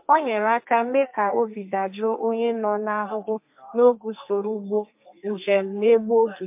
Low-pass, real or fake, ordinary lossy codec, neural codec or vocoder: 3.6 kHz; fake; MP3, 32 kbps; codec, 32 kHz, 1.9 kbps, SNAC